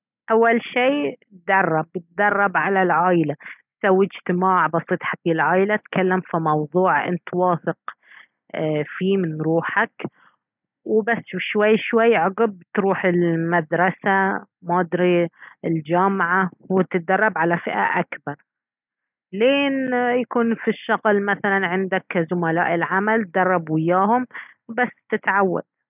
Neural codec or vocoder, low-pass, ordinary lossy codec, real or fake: none; 3.6 kHz; none; real